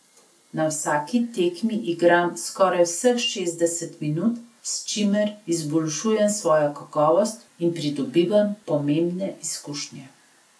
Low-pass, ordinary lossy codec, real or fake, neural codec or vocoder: none; none; real; none